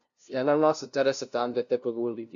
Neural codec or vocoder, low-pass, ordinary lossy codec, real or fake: codec, 16 kHz, 0.5 kbps, FunCodec, trained on LibriTTS, 25 frames a second; 7.2 kHz; none; fake